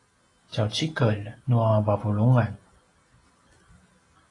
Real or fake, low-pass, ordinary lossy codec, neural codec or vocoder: real; 10.8 kHz; AAC, 32 kbps; none